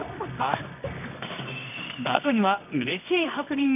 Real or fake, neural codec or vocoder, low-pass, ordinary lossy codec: fake; codec, 24 kHz, 0.9 kbps, WavTokenizer, medium music audio release; 3.6 kHz; none